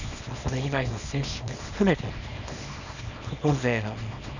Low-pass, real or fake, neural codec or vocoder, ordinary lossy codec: 7.2 kHz; fake; codec, 24 kHz, 0.9 kbps, WavTokenizer, small release; none